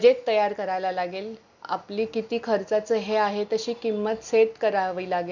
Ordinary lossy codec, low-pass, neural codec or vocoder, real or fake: none; 7.2 kHz; none; real